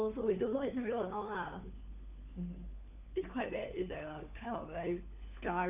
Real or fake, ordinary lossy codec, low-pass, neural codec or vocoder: fake; none; 3.6 kHz; codec, 16 kHz, 4 kbps, FunCodec, trained on LibriTTS, 50 frames a second